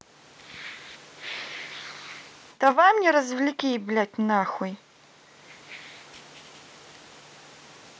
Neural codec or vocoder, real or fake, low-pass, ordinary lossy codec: none; real; none; none